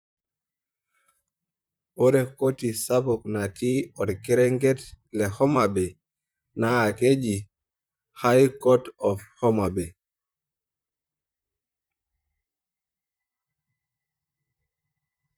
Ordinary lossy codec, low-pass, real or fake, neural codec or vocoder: none; none; fake; vocoder, 44.1 kHz, 128 mel bands, Pupu-Vocoder